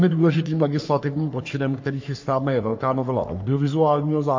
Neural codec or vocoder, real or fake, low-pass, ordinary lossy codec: codec, 44.1 kHz, 3.4 kbps, Pupu-Codec; fake; 7.2 kHz; MP3, 48 kbps